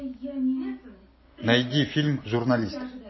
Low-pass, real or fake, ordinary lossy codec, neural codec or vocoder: 7.2 kHz; real; MP3, 24 kbps; none